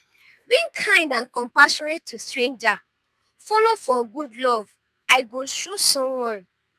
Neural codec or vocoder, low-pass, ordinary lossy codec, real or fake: codec, 32 kHz, 1.9 kbps, SNAC; 14.4 kHz; none; fake